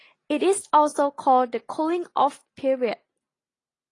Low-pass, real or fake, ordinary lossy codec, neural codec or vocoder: 10.8 kHz; real; AAC, 32 kbps; none